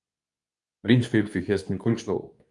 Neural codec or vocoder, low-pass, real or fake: codec, 24 kHz, 0.9 kbps, WavTokenizer, medium speech release version 2; 10.8 kHz; fake